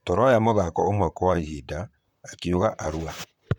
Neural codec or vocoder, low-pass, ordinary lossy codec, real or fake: vocoder, 44.1 kHz, 128 mel bands, Pupu-Vocoder; 19.8 kHz; none; fake